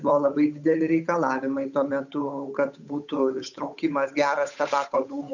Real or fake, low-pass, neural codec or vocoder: fake; 7.2 kHz; codec, 16 kHz, 8 kbps, FunCodec, trained on Chinese and English, 25 frames a second